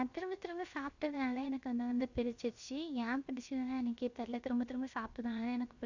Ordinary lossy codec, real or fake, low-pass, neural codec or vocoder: Opus, 64 kbps; fake; 7.2 kHz; codec, 16 kHz, 0.7 kbps, FocalCodec